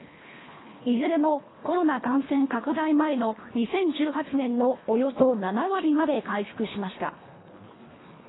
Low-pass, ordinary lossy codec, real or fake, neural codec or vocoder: 7.2 kHz; AAC, 16 kbps; fake; codec, 24 kHz, 1.5 kbps, HILCodec